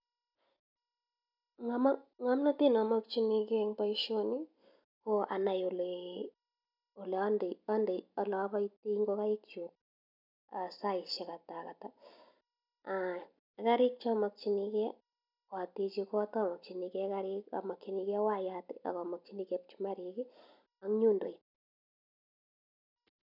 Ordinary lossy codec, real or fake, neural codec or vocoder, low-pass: none; real; none; 5.4 kHz